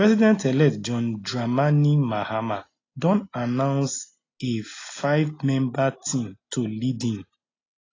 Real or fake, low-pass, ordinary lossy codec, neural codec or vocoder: real; 7.2 kHz; AAC, 32 kbps; none